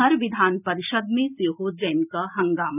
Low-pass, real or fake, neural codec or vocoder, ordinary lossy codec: 3.6 kHz; real; none; none